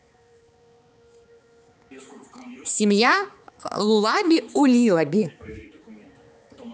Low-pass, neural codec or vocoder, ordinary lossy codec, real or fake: none; codec, 16 kHz, 4 kbps, X-Codec, HuBERT features, trained on balanced general audio; none; fake